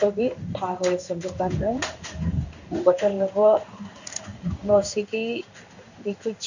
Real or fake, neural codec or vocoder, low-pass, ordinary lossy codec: fake; codec, 16 kHz in and 24 kHz out, 1 kbps, XY-Tokenizer; 7.2 kHz; none